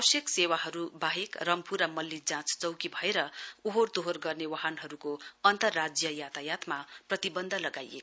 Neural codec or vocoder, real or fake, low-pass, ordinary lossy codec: none; real; none; none